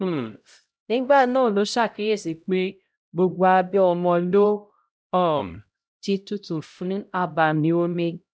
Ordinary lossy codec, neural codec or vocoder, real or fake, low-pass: none; codec, 16 kHz, 0.5 kbps, X-Codec, HuBERT features, trained on LibriSpeech; fake; none